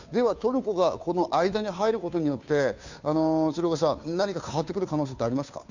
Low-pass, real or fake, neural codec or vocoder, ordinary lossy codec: 7.2 kHz; fake; codec, 16 kHz, 2 kbps, FunCodec, trained on Chinese and English, 25 frames a second; none